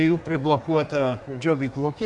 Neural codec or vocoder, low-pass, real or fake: codec, 24 kHz, 1 kbps, SNAC; 10.8 kHz; fake